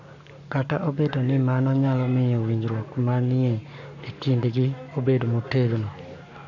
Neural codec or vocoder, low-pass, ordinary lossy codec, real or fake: codec, 44.1 kHz, 7.8 kbps, Pupu-Codec; 7.2 kHz; none; fake